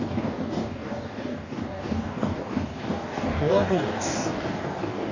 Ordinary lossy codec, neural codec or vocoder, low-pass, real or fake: none; codec, 44.1 kHz, 2.6 kbps, DAC; 7.2 kHz; fake